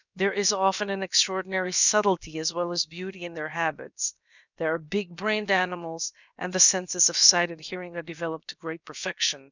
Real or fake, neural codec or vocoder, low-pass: fake; codec, 16 kHz, about 1 kbps, DyCAST, with the encoder's durations; 7.2 kHz